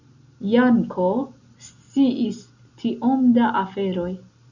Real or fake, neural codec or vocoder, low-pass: real; none; 7.2 kHz